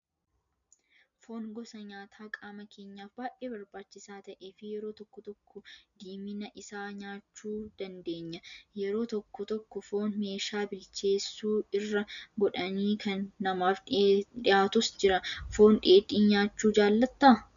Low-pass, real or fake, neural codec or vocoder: 7.2 kHz; real; none